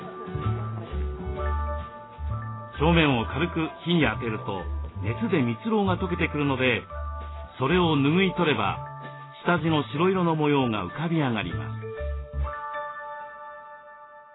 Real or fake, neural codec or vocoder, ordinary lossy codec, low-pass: real; none; AAC, 16 kbps; 7.2 kHz